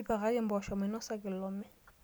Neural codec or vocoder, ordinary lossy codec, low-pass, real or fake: none; none; none; real